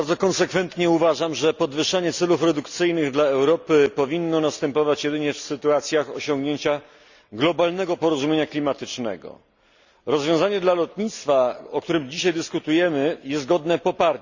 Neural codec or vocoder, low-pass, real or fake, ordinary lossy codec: none; 7.2 kHz; real; Opus, 64 kbps